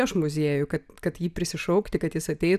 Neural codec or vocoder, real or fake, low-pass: none; real; 14.4 kHz